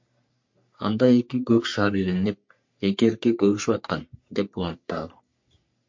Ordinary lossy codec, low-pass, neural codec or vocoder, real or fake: MP3, 48 kbps; 7.2 kHz; codec, 44.1 kHz, 3.4 kbps, Pupu-Codec; fake